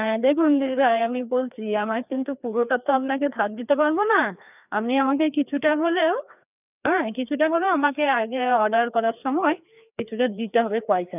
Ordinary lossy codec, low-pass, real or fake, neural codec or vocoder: none; 3.6 kHz; fake; codec, 16 kHz, 2 kbps, FreqCodec, larger model